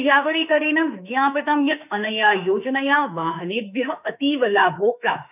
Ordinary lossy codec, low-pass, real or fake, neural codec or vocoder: none; 3.6 kHz; fake; autoencoder, 48 kHz, 32 numbers a frame, DAC-VAE, trained on Japanese speech